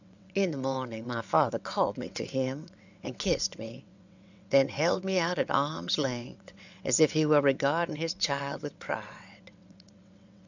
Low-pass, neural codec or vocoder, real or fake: 7.2 kHz; vocoder, 22.05 kHz, 80 mel bands, WaveNeXt; fake